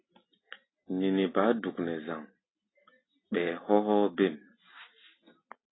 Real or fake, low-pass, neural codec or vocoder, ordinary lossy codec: real; 7.2 kHz; none; AAC, 16 kbps